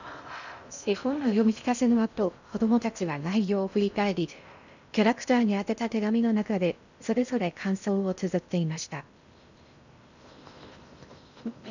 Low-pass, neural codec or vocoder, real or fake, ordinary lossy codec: 7.2 kHz; codec, 16 kHz in and 24 kHz out, 0.6 kbps, FocalCodec, streaming, 2048 codes; fake; none